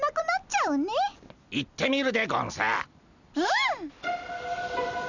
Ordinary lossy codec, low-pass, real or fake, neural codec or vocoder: none; 7.2 kHz; real; none